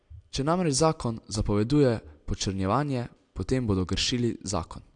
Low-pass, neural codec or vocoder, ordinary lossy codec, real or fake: 9.9 kHz; none; AAC, 64 kbps; real